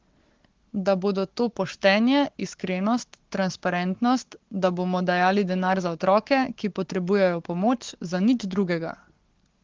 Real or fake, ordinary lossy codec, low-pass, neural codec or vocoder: fake; Opus, 16 kbps; 7.2 kHz; codec, 44.1 kHz, 7.8 kbps, Pupu-Codec